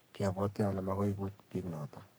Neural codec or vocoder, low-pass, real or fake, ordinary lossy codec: codec, 44.1 kHz, 3.4 kbps, Pupu-Codec; none; fake; none